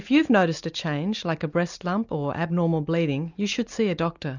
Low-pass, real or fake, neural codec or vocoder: 7.2 kHz; real; none